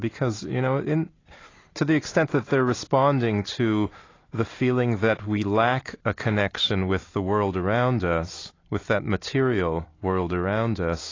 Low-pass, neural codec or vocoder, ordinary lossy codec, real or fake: 7.2 kHz; none; AAC, 32 kbps; real